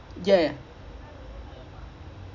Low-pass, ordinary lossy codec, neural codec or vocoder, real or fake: 7.2 kHz; none; none; real